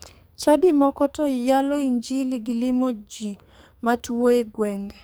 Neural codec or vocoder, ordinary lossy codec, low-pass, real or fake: codec, 44.1 kHz, 2.6 kbps, SNAC; none; none; fake